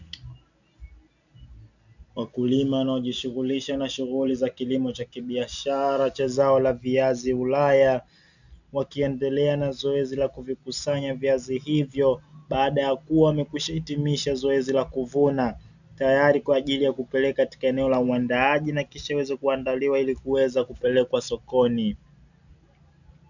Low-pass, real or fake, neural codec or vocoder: 7.2 kHz; real; none